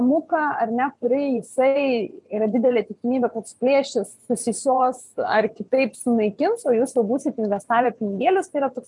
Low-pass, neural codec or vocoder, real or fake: 10.8 kHz; vocoder, 24 kHz, 100 mel bands, Vocos; fake